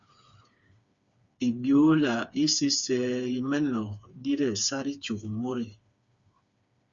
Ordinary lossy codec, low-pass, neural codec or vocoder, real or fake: Opus, 64 kbps; 7.2 kHz; codec, 16 kHz, 4 kbps, FreqCodec, smaller model; fake